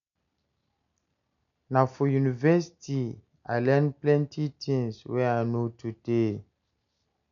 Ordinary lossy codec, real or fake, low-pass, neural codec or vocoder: none; real; 7.2 kHz; none